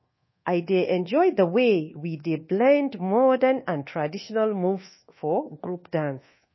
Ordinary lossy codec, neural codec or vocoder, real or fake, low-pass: MP3, 24 kbps; codec, 24 kHz, 1.2 kbps, DualCodec; fake; 7.2 kHz